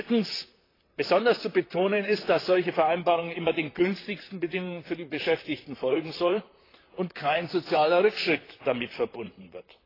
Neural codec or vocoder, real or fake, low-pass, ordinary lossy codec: vocoder, 44.1 kHz, 128 mel bands, Pupu-Vocoder; fake; 5.4 kHz; AAC, 24 kbps